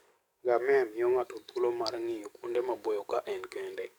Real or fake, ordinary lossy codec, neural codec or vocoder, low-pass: fake; none; codec, 44.1 kHz, 7.8 kbps, DAC; 19.8 kHz